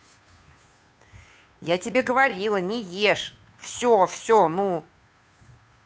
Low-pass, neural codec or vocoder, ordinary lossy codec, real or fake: none; codec, 16 kHz, 2 kbps, FunCodec, trained on Chinese and English, 25 frames a second; none; fake